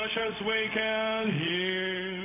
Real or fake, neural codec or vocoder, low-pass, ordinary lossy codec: fake; codec, 16 kHz, 0.4 kbps, LongCat-Audio-Codec; 3.6 kHz; AAC, 24 kbps